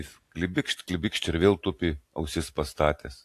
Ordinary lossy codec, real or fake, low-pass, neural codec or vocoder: AAC, 64 kbps; real; 14.4 kHz; none